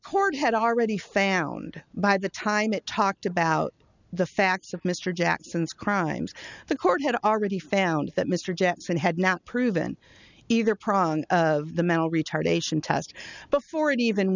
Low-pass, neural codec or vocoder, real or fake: 7.2 kHz; none; real